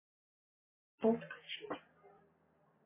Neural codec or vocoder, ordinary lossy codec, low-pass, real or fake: none; MP3, 16 kbps; 3.6 kHz; real